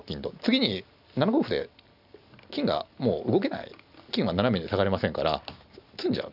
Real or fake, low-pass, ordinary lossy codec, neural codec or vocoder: real; 5.4 kHz; none; none